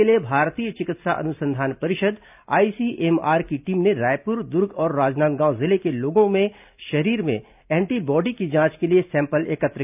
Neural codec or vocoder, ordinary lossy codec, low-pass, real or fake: none; none; 3.6 kHz; real